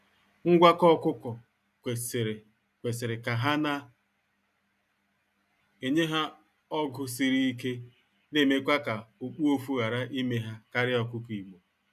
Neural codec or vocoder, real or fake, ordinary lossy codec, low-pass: none; real; none; 14.4 kHz